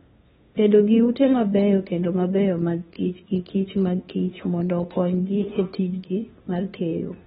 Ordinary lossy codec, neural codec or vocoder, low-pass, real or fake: AAC, 16 kbps; codec, 16 kHz, 2 kbps, FunCodec, trained on LibriTTS, 25 frames a second; 7.2 kHz; fake